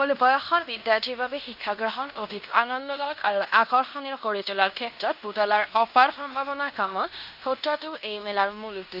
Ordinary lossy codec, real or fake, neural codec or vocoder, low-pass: none; fake; codec, 16 kHz in and 24 kHz out, 0.9 kbps, LongCat-Audio-Codec, fine tuned four codebook decoder; 5.4 kHz